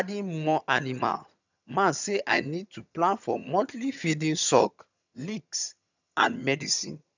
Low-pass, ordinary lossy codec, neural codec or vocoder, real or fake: 7.2 kHz; none; vocoder, 22.05 kHz, 80 mel bands, HiFi-GAN; fake